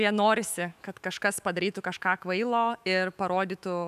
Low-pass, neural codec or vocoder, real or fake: 14.4 kHz; autoencoder, 48 kHz, 128 numbers a frame, DAC-VAE, trained on Japanese speech; fake